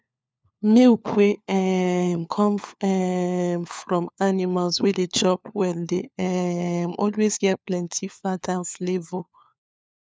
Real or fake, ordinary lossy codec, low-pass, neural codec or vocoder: fake; none; none; codec, 16 kHz, 4 kbps, FunCodec, trained on LibriTTS, 50 frames a second